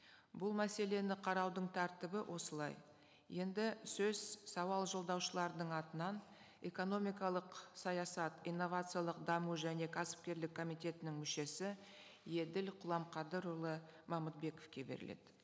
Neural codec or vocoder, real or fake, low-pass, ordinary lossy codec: none; real; none; none